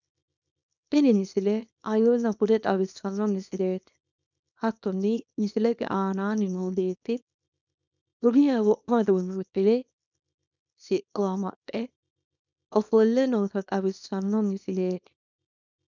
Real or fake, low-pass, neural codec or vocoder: fake; 7.2 kHz; codec, 24 kHz, 0.9 kbps, WavTokenizer, small release